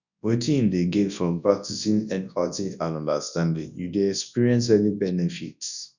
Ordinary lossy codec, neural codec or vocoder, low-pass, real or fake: none; codec, 24 kHz, 0.9 kbps, WavTokenizer, large speech release; 7.2 kHz; fake